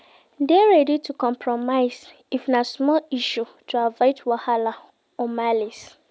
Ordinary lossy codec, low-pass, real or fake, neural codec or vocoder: none; none; real; none